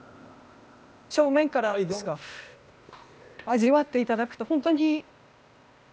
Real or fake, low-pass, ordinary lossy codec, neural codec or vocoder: fake; none; none; codec, 16 kHz, 0.8 kbps, ZipCodec